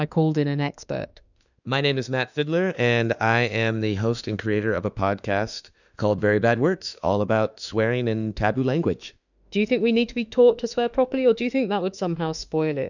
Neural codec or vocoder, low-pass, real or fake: autoencoder, 48 kHz, 32 numbers a frame, DAC-VAE, trained on Japanese speech; 7.2 kHz; fake